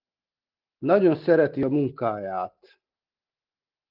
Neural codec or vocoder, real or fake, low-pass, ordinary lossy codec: none; real; 5.4 kHz; Opus, 32 kbps